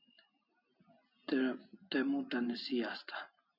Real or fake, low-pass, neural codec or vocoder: real; 5.4 kHz; none